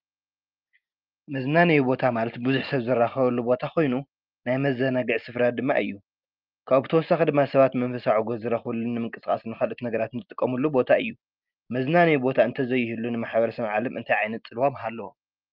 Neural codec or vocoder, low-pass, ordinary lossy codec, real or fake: none; 5.4 kHz; Opus, 32 kbps; real